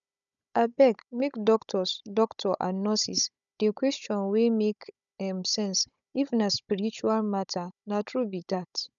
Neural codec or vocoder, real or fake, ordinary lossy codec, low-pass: codec, 16 kHz, 16 kbps, FunCodec, trained on Chinese and English, 50 frames a second; fake; none; 7.2 kHz